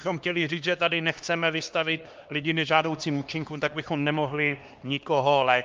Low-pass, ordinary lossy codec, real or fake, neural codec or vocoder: 7.2 kHz; Opus, 32 kbps; fake; codec, 16 kHz, 2 kbps, X-Codec, HuBERT features, trained on LibriSpeech